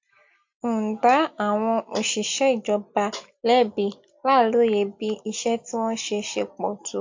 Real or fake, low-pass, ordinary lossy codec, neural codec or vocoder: real; 7.2 kHz; MP3, 48 kbps; none